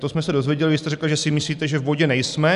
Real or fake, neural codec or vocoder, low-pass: real; none; 10.8 kHz